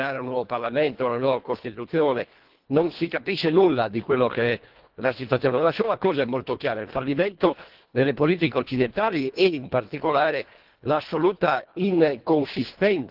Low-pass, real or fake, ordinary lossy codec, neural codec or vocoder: 5.4 kHz; fake; Opus, 16 kbps; codec, 24 kHz, 1.5 kbps, HILCodec